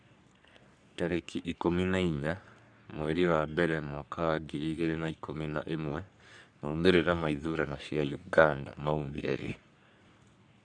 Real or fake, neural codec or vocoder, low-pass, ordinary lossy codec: fake; codec, 44.1 kHz, 3.4 kbps, Pupu-Codec; 9.9 kHz; none